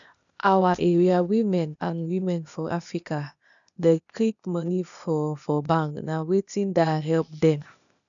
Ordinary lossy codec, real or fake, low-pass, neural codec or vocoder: none; fake; 7.2 kHz; codec, 16 kHz, 0.8 kbps, ZipCodec